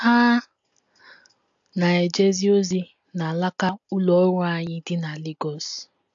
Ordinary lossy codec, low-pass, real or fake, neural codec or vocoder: AAC, 64 kbps; 7.2 kHz; real; none